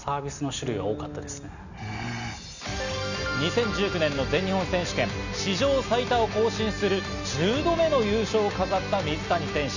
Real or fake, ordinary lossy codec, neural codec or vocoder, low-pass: real; none; none; 7.2 kHz